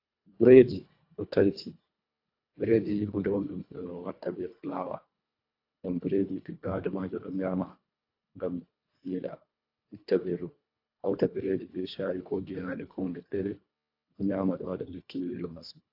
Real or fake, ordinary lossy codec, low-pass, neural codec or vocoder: fake; AAC, 32 kbps; 5.4 kHz; codec, 24 kHz, 1.5 kbps, HILCodec